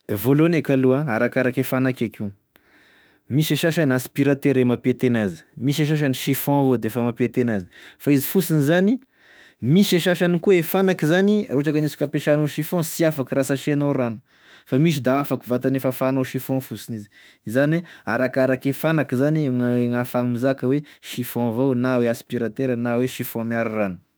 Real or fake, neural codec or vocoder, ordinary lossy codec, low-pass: fake; autoencoder, 48 kHz, 32 numbers a frame, DAC-VAE, trained on Japanese speech; none; none